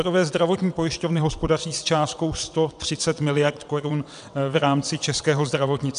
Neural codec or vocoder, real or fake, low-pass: vocoder, 22.05 kHz, 80 mel bands, WaveNeXt; fake; 9.9 kHz